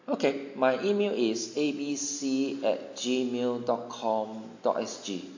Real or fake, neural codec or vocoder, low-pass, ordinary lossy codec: real; none; 7.2 kHz; AAC, 48 kbps